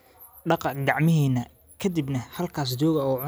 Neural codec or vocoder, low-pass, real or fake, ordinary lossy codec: none; none; real; none